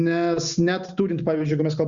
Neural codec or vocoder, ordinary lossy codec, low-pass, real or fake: none; Opus, 64 kbps; 7.2 kHz; real